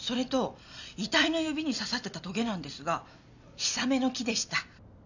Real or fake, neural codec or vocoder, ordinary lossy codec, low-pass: real; none; none; 7.2 kHz